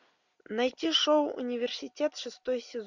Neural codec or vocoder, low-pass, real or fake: none; 7.2 kHz; real